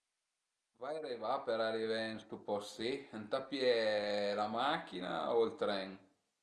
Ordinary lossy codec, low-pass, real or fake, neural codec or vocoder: Opus, 32 kbps; 10.8 kHz; real; none